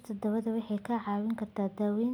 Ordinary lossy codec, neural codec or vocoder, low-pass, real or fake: none; none; 19.8 kHz; real